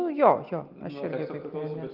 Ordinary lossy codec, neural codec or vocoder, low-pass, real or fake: Opus, 32 kbps; none; 5.4 kHz; real